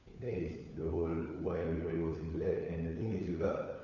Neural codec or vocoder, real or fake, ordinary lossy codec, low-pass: codec, 16 kHz, 4 kbps, FunCodec, trained on LibriTTS, 50 frames a second; fake; none; 7.2 kHz